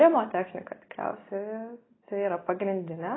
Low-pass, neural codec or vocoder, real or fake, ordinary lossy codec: 7.2 kHz; none; real; AAC, 16 kbps